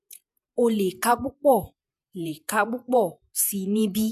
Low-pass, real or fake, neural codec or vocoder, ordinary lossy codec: 14.4 kHz; fake; vocoder, 48 kHz, 128 mel bands, Vocos; none